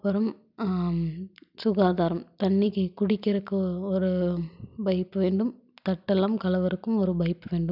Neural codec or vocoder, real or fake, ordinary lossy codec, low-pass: none; real; none; 5.4 kHz